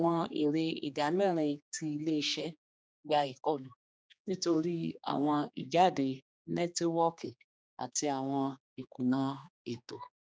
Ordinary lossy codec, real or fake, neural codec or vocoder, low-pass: none; fake; codec, 16 kHz, 2 kbps, X-Codec, HuBERT features, trained on general audio; none